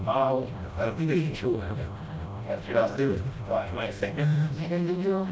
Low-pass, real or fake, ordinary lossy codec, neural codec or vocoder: none; fake; none; codec, 16 kHz, 0.5 kbps, FreqCodec, smaller model